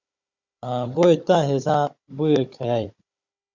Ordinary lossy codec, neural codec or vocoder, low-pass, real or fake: Opus, 64 kbps; codec, 16 kHz, 16 kbps, FunCodec, trained on Chinese and English, 50 frames a second; 7.2 kHz; fake